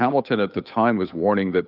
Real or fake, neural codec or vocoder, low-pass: fake; codec, 16 kHz, 8 kbps, FunCodec, trained on Chinese and English, 25 frames a second; 5.4 kHz